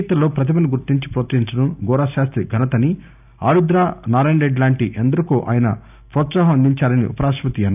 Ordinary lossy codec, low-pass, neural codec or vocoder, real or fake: none; 3.6 kHz; none; real